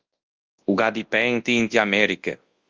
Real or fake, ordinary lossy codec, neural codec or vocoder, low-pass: fake; Opus, 32 kbps; codec, 24 kHz, 0.9 kbps, WavTokenizer, large speech release; 7.2 kHz